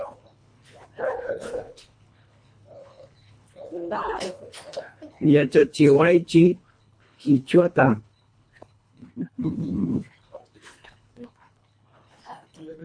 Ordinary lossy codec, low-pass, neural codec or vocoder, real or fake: MP3, 48 kbps; 9.9 kHz; codec, 24 kHz, 1.5 kbps, HILCodec; fake